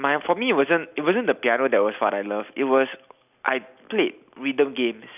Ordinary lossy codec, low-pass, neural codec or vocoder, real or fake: none; 3.6 kHz; none; real